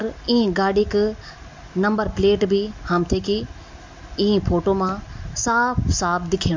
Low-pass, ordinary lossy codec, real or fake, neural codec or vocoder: 7.2 kHz; MP3, 48 kbps; real; none